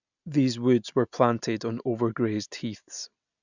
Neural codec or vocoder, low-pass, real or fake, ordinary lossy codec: none; 7.2 kHz; real; none